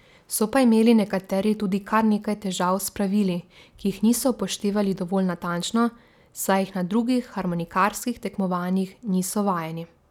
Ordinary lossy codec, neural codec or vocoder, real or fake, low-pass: none; none; real; 19.8 kHz